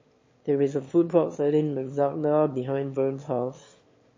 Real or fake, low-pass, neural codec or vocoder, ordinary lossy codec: fake; 7.2 kHz; autoencoder, 22.05 kHz, a latent of 192 numbers a frame, VITS, trained on one speaker; MP3, 32 kbps